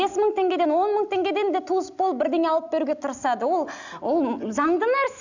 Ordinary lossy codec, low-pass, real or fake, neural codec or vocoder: none; 7.2 kHz; real; none